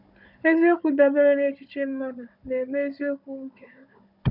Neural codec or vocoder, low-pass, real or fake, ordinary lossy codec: codec, 16 kHz, 16 kbps, FunCodec, trained on Chinese and English, 50 frames a second; 5.4 kHz; fake; none